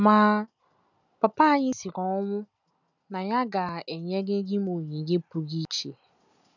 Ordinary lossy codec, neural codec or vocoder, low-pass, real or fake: none; none; 7.2 kHz; real